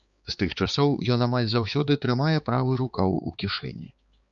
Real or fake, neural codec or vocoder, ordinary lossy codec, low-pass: fake; codec, 16 kHz, 4 kbps, X-Codec, HuBERT features, trained on balanced general audio; Opus, 64 kbps; 7.2 kHz